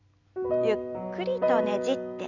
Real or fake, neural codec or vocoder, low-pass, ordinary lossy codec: real; none; 7.2 kHz; none